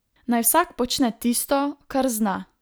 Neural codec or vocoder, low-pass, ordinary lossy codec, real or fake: none; none; none; real